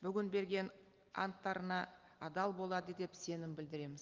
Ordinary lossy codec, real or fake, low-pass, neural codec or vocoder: Opus, 32 kbps; real; 7.2 kHz; none